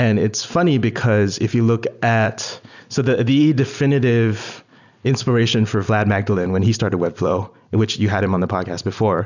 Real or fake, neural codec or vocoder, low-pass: real; none; 7.2 kHz